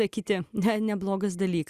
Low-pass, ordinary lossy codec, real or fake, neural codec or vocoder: 14.4 kHz; Opus, 64 kbps; real; none